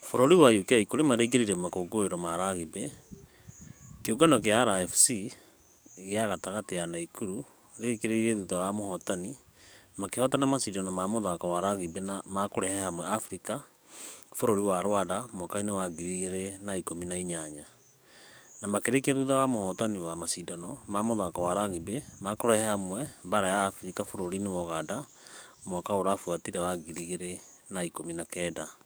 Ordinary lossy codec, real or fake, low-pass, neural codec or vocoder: none; fake; none; codec, 44.1 kHz, 7.8 kbps, DAC